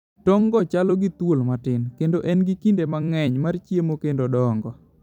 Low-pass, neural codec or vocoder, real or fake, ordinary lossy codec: 19.8 kHz; vocoder, 44.1 kHz, 128 mel bands every 256 samples, BigVGAN v2; fake; none